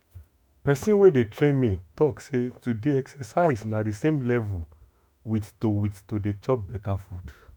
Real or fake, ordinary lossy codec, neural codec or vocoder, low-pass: fake; none; autoencoder, 48 kHz, 32 numbers a frame, DAC-VAE, trained on Japanese speech; none